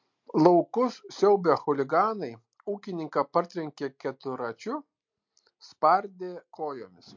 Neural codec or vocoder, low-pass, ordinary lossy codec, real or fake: none; 7.2 kHz; MP3, 48 kbps; real